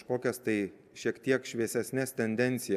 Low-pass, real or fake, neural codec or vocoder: 14.4 kHz; real; none